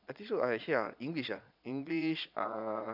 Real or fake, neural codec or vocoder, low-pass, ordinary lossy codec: fake; vocoder, 22.05 kHz, 80 mel bands, Vocos; 5.4 kHz; none